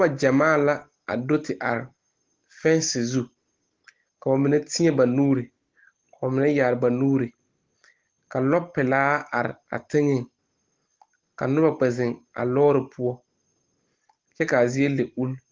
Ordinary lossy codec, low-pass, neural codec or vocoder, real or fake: Opus, 16 kbps; 7.2 kHz; none; real